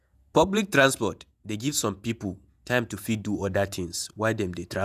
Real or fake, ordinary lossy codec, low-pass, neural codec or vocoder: fake; none; 14.4 kHz; vocoder, 48 kHz, 128 mel bands, Vocos